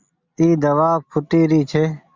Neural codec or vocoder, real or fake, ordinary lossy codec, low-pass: none; real; Opus, 64 kbps; 7.2 kHz